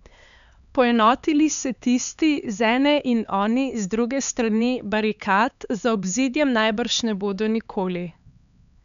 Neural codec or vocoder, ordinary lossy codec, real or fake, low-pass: codec, 16 kHz, 4 kbps, X-Codec, HuBERT features, trained on LibriSpeech; none; fake; 7.2 kHz